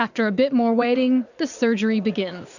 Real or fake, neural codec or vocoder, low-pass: fake; vocoder, 44.1 kHz, 80 mel bands, Vocos; 7.2 kHz